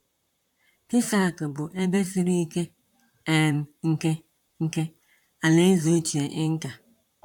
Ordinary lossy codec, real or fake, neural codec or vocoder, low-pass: none; fake; vocoder, 44.1 kHz, 128 mel bands, Pupu-Vocoder; 19.8 kHz